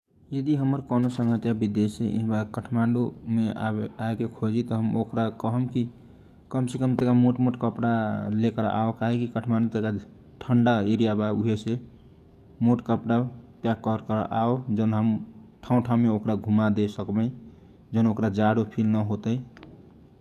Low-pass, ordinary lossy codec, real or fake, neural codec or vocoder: 14.4 kHz; none; fake; codec, 44.1 kHz, 7.8 kbps, DAC